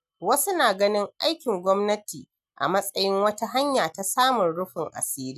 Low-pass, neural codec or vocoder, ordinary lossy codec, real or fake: 14.4 kHz; none; none; real